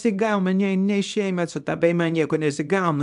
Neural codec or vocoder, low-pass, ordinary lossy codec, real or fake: codec, 24 kHz, 0.9 kbps, WavTokenizer, small release; 10.8 kHz; AAC, 96 kbps; fake